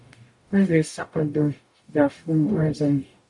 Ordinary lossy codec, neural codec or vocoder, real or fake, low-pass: MP3, 64 kbps; codec, 44.1 kHz, 0.9 kbps, DAC; fake; 10.8 kHz